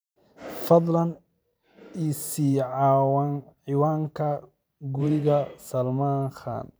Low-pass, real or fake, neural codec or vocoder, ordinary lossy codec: none; fake; vocoder, 44.1 kHz, 128 mel bands every 512 samples, BigVGAN v2; none